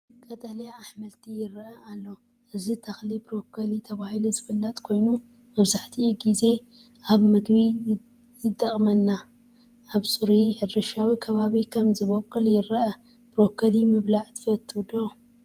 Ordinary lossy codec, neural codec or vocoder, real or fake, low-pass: Opus, 32 kbps; vocoder, 48 kHz, 128 mel bands, Vocos; fake; 14.4 kHz